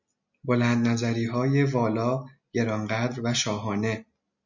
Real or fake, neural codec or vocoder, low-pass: real; none; 7.2 kHz